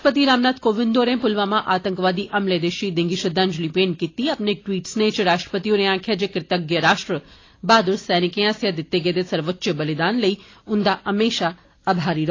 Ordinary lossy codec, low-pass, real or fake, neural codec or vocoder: AAC, 32 kbps; 7.2 kHz; real; none